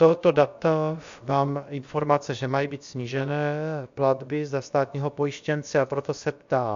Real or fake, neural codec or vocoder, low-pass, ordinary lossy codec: fake; codec, 16 kHz, about 1 kbps, DyCAST, with the encoder's durations; 7.2 kHz; AAC, 64 kbps